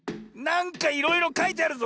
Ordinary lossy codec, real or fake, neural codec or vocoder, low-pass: none; real; none; none